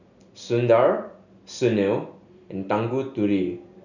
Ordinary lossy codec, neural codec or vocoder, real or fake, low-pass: none; none; real; 7.2 kHz